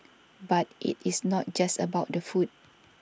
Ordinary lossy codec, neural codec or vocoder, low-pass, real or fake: none; none; none; real